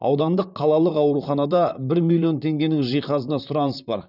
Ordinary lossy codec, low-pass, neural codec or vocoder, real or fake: none; 5.4 kHz; vocoder, 44.1 kHz, 128 mel bands, Pupu-Vocoder; fake